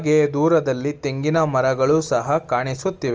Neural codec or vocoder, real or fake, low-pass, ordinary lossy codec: none; real; 7.2 kHz; Opus, 24 kbps